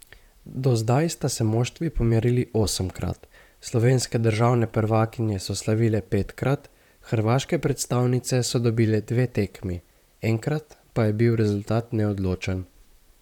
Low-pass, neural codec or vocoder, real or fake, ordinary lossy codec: 19.8 kHz; vocoder, 44.1 kHz, 128 mel bands, Pupu-Vocoder; fake; none